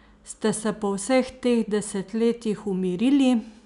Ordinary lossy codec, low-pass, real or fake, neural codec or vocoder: none; 10.8 kHz; real; none